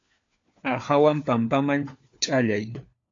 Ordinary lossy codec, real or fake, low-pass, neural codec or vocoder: AAC, 48 kbps; fake; 7.2 kHz; codec, 16 kHz, 4 kbps, FunCodec, trained on LibriTTS, 50 frames a second